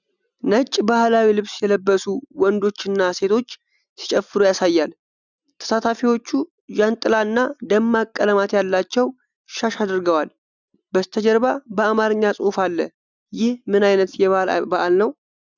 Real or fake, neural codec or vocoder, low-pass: real; none; 7.2 kHz